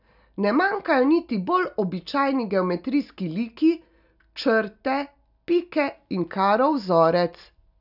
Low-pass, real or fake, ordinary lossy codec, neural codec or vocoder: 5.4 kHz; real; none; none